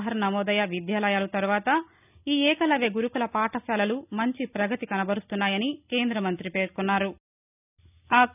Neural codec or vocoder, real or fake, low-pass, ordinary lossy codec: none; real; 3.6 kHz; none